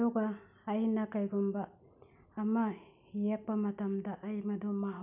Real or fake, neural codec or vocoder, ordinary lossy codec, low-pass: real; none; none; 3.6 kHz